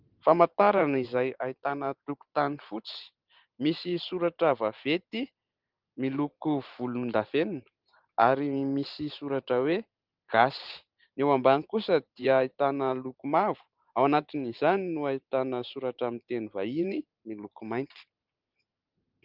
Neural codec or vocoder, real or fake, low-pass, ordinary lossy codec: vocoder, 44.1 kHz, 128 mel bands every 512 samples, BigVGAN v2; fake; 5.4 kHz; Opus, 32 kbps